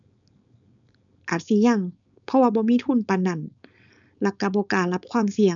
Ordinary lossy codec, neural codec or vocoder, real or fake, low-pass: none; codec, 16 kHz, 4.8 kbps, FACodec; fake; 7.2 kHz